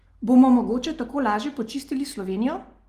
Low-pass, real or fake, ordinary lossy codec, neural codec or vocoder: 14.4 kHz; real; Opus, 24 kbps; none